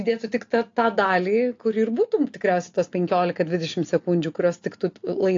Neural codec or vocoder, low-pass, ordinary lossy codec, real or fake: none; 7.2 kHz; AAC, 48 kbps; real